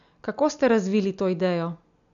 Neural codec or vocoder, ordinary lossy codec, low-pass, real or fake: none; none; 7.2 kHz; real